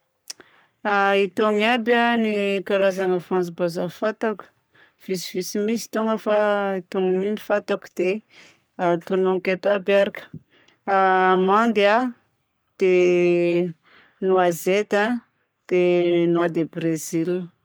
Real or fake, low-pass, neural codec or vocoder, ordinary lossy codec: fake; none; codec, 44.1 kHz, 3.4 kbps, Pupu-Codec; none